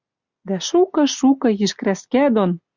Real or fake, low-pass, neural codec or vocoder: real; 7.2 kHz; none